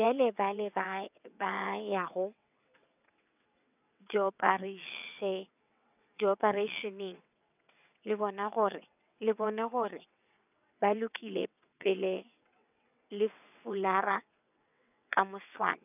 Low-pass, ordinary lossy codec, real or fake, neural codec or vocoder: 3.6 kHz; none; fake; vocoder, 22.05 kHz, 80 mel bands, WaveNeXt